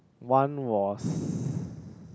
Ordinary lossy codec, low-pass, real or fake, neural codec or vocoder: none; none; real; none